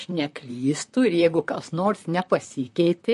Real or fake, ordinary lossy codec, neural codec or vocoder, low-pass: fake; MP3, 48 kbps; vocoder, 44.1 kHz, 128 mel bands, Pupu-Vocoder; 14.4 kHz